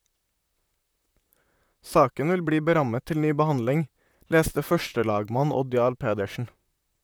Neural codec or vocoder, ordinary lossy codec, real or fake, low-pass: vocoder, 44.1 kHz, 128 mel bands, Pupu-Vocoder; none; fake; none